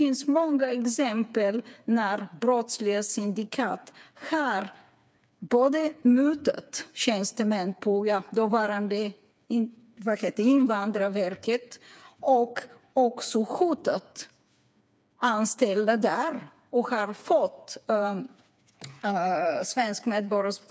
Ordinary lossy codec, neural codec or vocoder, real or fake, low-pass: none; codec, 16 kHz, 4 kbps, FreqCodec, smaller model; fake; none